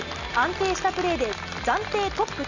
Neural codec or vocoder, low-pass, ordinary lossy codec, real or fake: none; 7.2 kHz; none; real